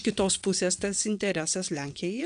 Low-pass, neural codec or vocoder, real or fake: 9.9 kHz; vocoder, 22.05 kHz, 80 mel bands, WaveNeXt; fake